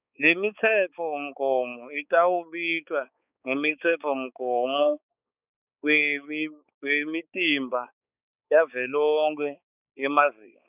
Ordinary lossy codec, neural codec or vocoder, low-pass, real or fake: none; codec, 16 kHz, 4 kbps, X-Codec, HuBERT features, trained on balanced general audio; 3.6 kHz; fake